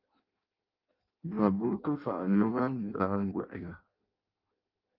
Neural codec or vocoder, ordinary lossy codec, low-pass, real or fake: codec, 16 kHz in and 24 kHz out, 0.6 kbps, FireRedTTS-2 codec; Opus, 32 kbps; 5.4 kHz; fake